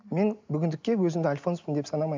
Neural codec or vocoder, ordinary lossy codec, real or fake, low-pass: none; MP3, 64 kbps; real; 7.2 kHz